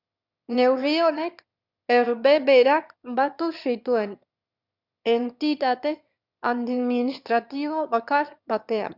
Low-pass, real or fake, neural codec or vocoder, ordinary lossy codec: 5.4 kHz; fake; autoencoder, 22.05 kHz, a latent of 192 numbers a frame, VITS, trained on one speaker; Opus, 64 kbps